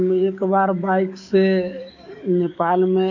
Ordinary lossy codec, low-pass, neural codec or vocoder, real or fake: none; 7.2 kHz; codec, 44.1 kHz, 7.8 kbps, DAC; fake